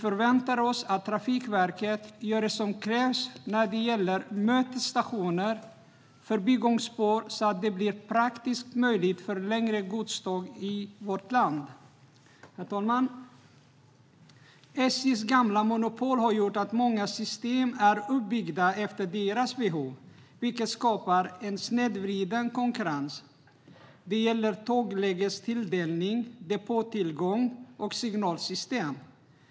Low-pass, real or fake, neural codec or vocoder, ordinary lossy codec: none; real; none; none